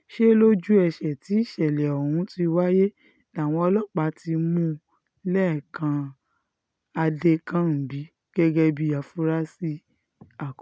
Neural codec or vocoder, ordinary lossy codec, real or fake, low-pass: none; none; real; none